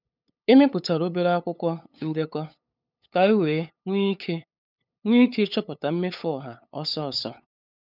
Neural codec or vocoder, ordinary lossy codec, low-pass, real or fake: codec, 16 kHz, 8 kbps, FunCodec, trained on LibriTTS, 25 frames a second; none; 5.4 kHz; fake